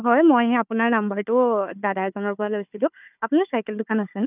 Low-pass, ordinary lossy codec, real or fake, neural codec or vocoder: 3.6 kHz; none; fake; codec, 16 kHz, 4 kbps, FunCodec, trained on Chinese and English, 50 frames a second